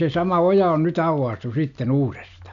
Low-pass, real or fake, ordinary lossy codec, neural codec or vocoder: 7.2 kHz; real; none; none